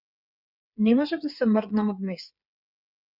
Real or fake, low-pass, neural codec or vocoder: fake; 5.4 kHz; codec, 16 kHz, 8 kbps, FreqCodec, smaller model